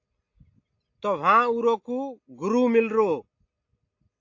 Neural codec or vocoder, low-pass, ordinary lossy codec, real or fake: none; 7.2 kHz; AAC, 48 kbps; real